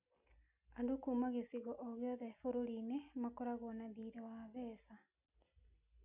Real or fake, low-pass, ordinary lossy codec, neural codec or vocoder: real; 3.6 kHz; none; none